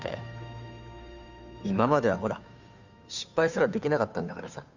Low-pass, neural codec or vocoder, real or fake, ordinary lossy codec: 7.2 kHz; codec, 16 kHz, 2 kbps, FunCodec, trained on Chinese and English, 25 frames a second; fake; none